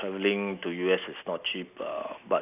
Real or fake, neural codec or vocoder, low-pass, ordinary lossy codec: real; none; 3.6 kHz; none